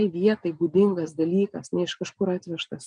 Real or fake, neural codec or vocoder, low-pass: real; none; 9.9 kHz